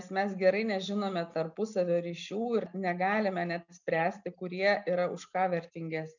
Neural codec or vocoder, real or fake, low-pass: none; real; 7.2 kHz